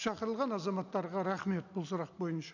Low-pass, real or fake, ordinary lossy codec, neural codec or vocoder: 7.2 kHz; real; none; none